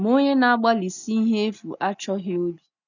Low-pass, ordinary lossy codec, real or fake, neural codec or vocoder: 7.2 kHz; none; real; none